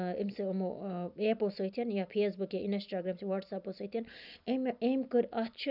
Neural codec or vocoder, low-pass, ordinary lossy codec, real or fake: none; 5.4 kHz; none; real